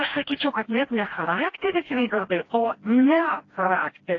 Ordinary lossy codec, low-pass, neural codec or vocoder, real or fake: AAC, 32 kbps; 7.2 kHz; codec, 16 kHz, 1 kbps, FreqCodec, smaller model; fake